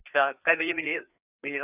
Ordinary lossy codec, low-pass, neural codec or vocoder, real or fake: none; 3.6 kHz; codec, 16 kHz, 4 kbps, FreqCodec, larger model; fake